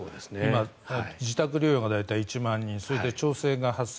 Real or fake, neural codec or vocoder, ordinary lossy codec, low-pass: real; none; none; none